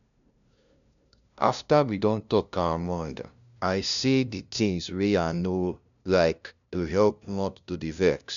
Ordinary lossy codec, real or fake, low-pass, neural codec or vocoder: none; fake; 7.2 kHz; codec, 16 kHz, 0.5 kbps, FunCodec, trained on LibriTTS, 25 frames a second